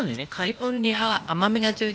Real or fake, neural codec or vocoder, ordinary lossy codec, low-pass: fake; codec, 16 kHz, 0.8 kbps, ZipCodec; none; none